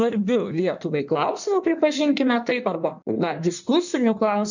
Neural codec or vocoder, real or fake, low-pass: codec, 16 kHz in and 24 kHz out, 1.1 kbps, FireRedTTS-2 codec; fake; 7.2 kHz